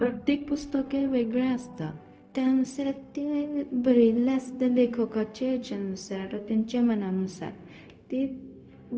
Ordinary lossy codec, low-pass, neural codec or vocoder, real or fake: none; none; codec, 16 kHz, 0.4 kbps, LongCat-Audio-Codec; fake